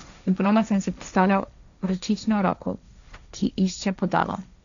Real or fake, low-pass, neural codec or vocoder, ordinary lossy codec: fake; 7.2 kHz; codec, 16 kHz, 1.1 kbps, Voila-Tokenizer; none